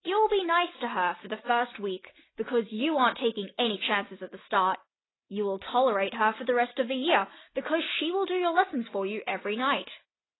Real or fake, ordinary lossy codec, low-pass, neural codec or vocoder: real; AAC, 16 kbps; 7.2 kHz; none